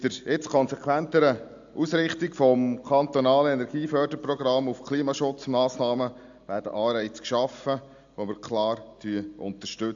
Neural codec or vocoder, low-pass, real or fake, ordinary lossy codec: none; 7.2 kHz; real; AAC, 64 kbps